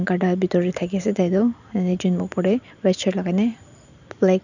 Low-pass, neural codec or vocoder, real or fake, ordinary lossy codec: 7.2 kHz; vocoder, 44.1 kHz, 128 mel bands every 512 samples, BigVGAN v2; fake; none